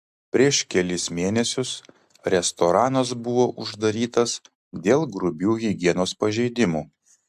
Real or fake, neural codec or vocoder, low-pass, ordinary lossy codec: real; none; 14.4 kHz; AAC, 96 kbps